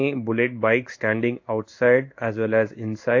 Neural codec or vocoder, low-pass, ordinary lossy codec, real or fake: codec, 16 kHz in and 24 kHz out, 1 kbps, XY-Tokenizer; 7.2 kHz; AAC, 48 kbps; fake